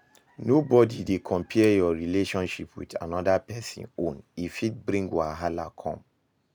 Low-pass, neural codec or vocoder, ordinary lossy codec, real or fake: none; none; none; real